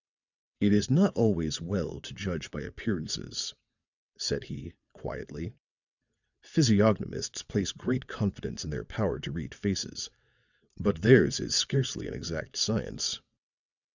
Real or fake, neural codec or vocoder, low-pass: fake; vocoder, 22.05 kHz, 80 mel bands, WaveNeXt; 7.2 kHz